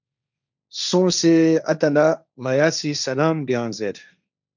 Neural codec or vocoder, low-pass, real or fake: codec, 16 kHz, 1.1 kbps, Voila-Tokenizer; 7.2 kHz; fake